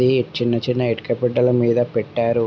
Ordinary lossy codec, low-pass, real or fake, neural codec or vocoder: none; none; real; none